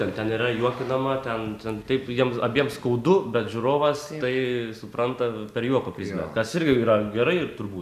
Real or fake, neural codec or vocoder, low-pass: real; none; 14.4 kHz